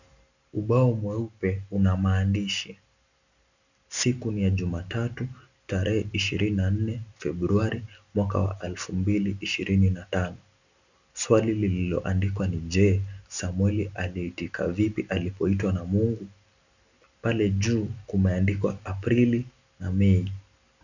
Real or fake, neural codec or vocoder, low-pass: real; none; 7.2 kHz